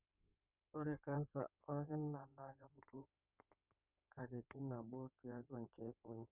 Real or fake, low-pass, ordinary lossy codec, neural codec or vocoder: fake; 3.6 kHz; none; codec, 16 kHz in and 24 kHz out, 2.2 kbps, FireRedTTS-2 codec